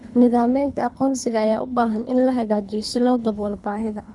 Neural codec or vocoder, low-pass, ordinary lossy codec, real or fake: codec, 24 kHz, 3 kbps, HILCodec; 10.8 kHz; none; fake